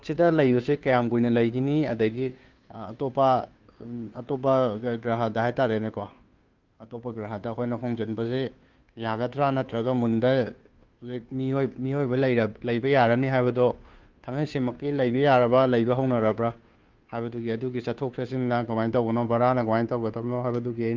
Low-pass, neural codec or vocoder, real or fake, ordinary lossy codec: 7.2 kHz; codec, 16 kHz, 2 kbps, FunCodec, trained on Chinese and English, 25 frames a second; fake; Opus, 24 kbps